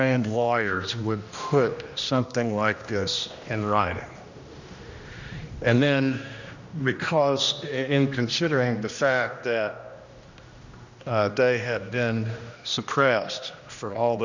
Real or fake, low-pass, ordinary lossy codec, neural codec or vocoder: fake; 7.2 kHz; Opus, 64 kbps; codec, 16 kHz, 1 kbps, X-Codec, HuBERT features, trained on general audio